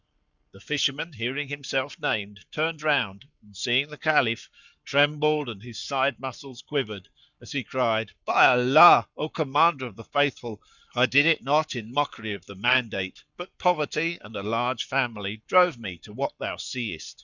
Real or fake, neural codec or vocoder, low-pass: fake; codec, 44.1 kHz, 7.8 kbps, Pupu-Codec; 7.2 kHz